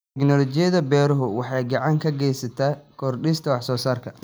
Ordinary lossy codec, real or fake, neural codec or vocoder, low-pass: none; real; none; none